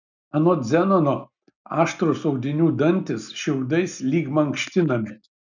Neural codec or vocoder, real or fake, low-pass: none; real; 7.2 kHz